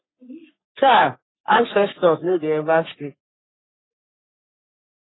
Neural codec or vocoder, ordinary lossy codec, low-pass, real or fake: codec, 44.1 kHz, 3.4 kbps, Pupu-Codec; AAC, 16 kbps; 7.2 kHz; fake